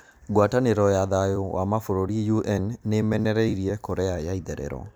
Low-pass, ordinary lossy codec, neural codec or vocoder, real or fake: none; none; vocoder, 44.1 kHz, 128 mel bands every 256 samples, BigVGAN v2; fake